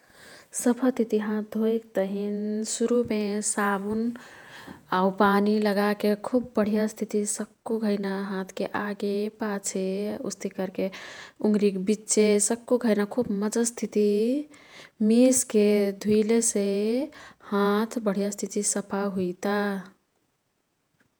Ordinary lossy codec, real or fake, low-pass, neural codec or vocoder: none; fake; none; vocoder, 48 kHz, 128 mel bands, Vocos